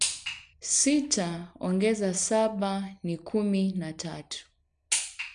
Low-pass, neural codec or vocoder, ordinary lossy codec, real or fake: 9.9 kHz; none; none; real